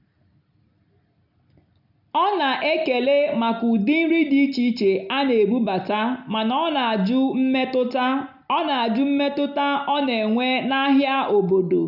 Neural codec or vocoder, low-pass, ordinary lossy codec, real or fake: none; 5.4 kHz; none; real